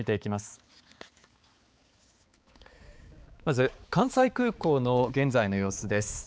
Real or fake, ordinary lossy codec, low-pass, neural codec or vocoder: fake; none; none; codec, 16 kHz, 4 kbps, X-Codec, HuBERT features, trained on balanced general audio